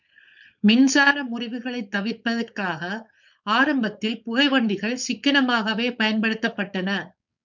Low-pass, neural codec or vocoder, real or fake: 7.2 kHz; codec, 16 kHz, 4.8 kbps, FACodec; fake